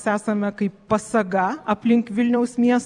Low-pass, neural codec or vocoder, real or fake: 10.8 kHz; vocoder, 44.1 kHz, 128 mel bands every 256 samples, BigVGAN v2; fake